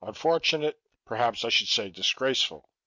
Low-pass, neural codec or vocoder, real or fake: 7.2 kHz; none; real